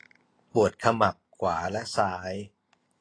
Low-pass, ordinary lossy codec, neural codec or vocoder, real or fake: 9.9 kHz; AAC, 32 kbps; none; real